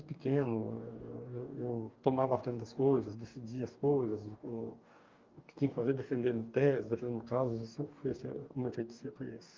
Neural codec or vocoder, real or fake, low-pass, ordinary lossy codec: codec, 44.1 kHz, 2.6 kbps, DAC; fake; 7.2 kHz; Opus, 24 kbps